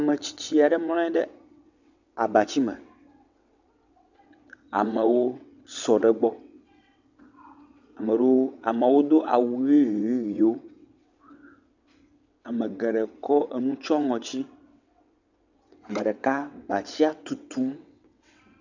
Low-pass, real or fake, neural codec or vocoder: 7.2 kHz; fake; vocoder, 22.05 kHz, 80 mel bands, WaveNeXt